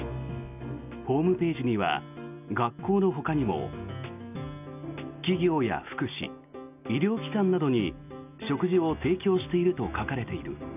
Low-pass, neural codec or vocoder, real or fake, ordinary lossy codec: 3.6 kHz; none; real; none